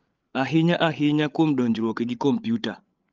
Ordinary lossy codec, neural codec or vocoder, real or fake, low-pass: Opus, 24 kbps; codec, 16 kHz, 8 kbps, FreqCodec, larger model; fake; 7.2 kHz